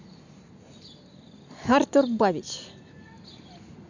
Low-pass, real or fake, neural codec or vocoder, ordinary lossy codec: 7.2 kHz; real; none; none